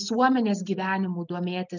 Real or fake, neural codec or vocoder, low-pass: real; none; 7.2 kHz